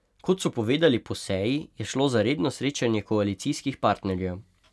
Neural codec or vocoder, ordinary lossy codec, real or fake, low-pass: none; none; real; none